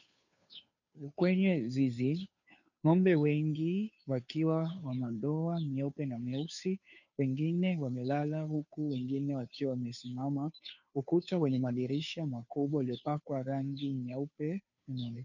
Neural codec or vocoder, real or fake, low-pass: codec, 16 kHz, 2 kbps, FunCodec, trained on Chinese and English, 25 frames a second; fake; 7.2 kHz